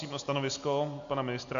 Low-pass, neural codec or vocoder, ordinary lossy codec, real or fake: 7.2 kHz; none; MP3, 64 kbps; real